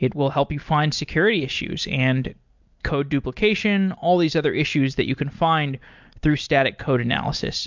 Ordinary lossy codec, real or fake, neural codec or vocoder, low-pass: MP3, 64 kbps; real; none; 7.2 kHz